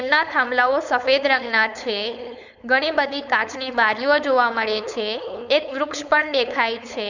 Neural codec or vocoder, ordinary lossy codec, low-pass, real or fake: codec, 16 kHz, 4.8 kbps, FACodec; none; 7.2 kHz; fake